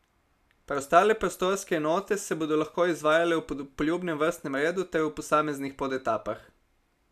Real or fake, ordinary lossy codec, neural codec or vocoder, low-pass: real; none; none; 14.4 kHz